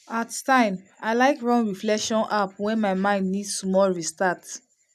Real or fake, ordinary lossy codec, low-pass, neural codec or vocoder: real; none; 14.4 kHz; none